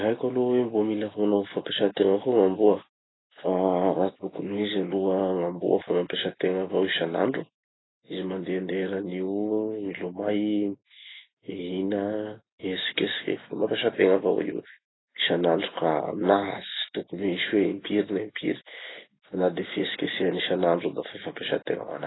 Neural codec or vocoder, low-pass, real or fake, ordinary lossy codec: none; 7.2 kHz; real; AAC, 16 kbps